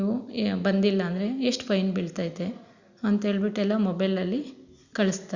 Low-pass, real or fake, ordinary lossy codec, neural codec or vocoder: 7.2 kHz; real; Opus, 64 kbps; none